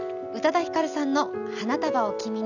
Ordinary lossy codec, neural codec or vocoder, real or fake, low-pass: none; none; real; 7.2 kHz